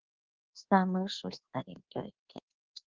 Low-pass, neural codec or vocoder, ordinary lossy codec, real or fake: 7.2 kHz; codec, 24 kHz, 1.2 kbps, DualCodec; Opus, 16 kbps; fake